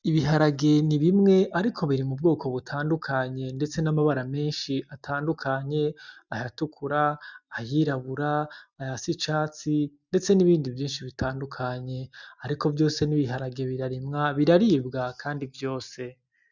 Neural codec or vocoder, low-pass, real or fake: none; 7.2 kHz; real